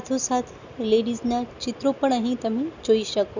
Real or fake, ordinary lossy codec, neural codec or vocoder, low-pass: real; none; none; 7.2 kHz